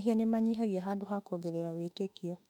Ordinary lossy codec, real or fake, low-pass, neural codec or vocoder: none; fake; 19.8 kHz; autoencoder, 48 kHz, 32 numbers a frame, DAC-VAE, trained on Japanese speech